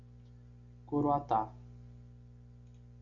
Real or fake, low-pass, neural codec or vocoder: real; 7.2 kHz; none